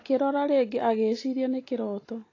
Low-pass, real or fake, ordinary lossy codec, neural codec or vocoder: 7.2 kHz; real; AAC, 32 kbps; none